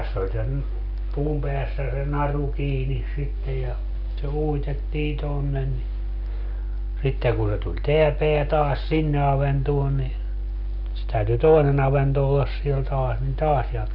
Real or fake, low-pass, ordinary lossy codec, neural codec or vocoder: real; 5.4 kHz; none; none